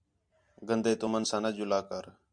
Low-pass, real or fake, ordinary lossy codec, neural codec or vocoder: 9.9 kHz; real; MP3, 64 kbps; none